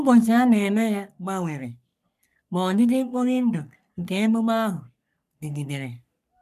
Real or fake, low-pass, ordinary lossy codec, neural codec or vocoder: fake; 14.4 kHz; none; codec, 44.1 kHz, 3.4 kbps, Pupu-Codec